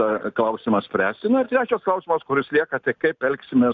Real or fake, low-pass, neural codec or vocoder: real; 7.2 kHz; none